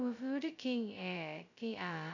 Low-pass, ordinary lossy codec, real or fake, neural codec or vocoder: 7.2 kHz; none; fake; codec, 16 kHz, 0.2 kbps, FocalCodec